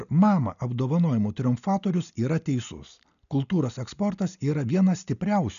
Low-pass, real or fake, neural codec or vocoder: 7.2 kHz; real; none